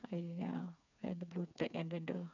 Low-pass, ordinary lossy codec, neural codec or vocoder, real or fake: 7.2 kHz; none; codec, 16 kHz, 4 kbps, FreqCodec, smaller model; fake